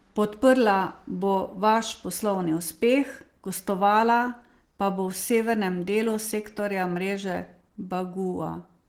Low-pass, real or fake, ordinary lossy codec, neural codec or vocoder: 19.8 kHz; real; Opus, 16 kbps; none